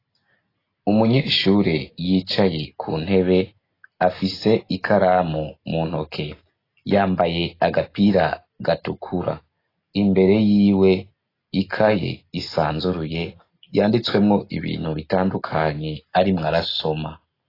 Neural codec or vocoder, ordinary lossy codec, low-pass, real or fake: none; AAC, 24 kbps; 5.4 kHz; real